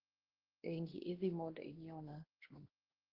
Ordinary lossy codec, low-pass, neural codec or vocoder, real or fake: Opus, 16 kbps; 5.4 kHz; codec, 16 kHz, 1 kbps, X-Codec, WavLM features, trained on Multilingual LibriSpeech; fake